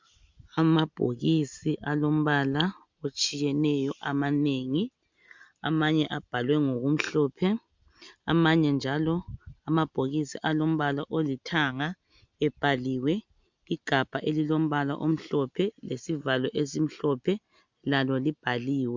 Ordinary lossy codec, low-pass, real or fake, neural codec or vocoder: MP3, 64 kbps; 7.2 kHz; real; none